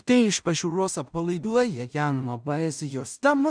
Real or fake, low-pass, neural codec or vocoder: fake; 9.9 kHz; codec, 16 kHz in and 24 kHz out, 0.4 kbps, LongCat-Audio-Codec, two codebook decoder